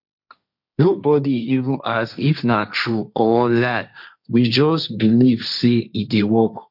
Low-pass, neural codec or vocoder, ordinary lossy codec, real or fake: 5.4 kHz; codec, 16 kHz, 1.1 kbps, Voila-Tokenizer; none; fake